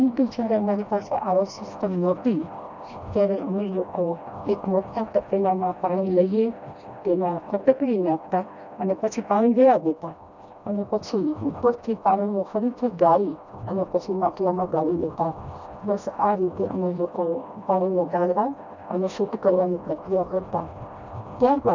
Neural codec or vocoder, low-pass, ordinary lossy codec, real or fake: codec, 16 kHz, 1 kbps, FreqCodec, smaller model; 7.2 kHz; none; fake